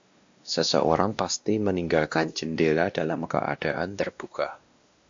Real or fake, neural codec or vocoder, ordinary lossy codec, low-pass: fake; codec, 16 kHz, 1 kbps, X-Codec, WavLM features, trained on Multilingual LibriSpeech; AAC, 64 kbps; 7.2 kHz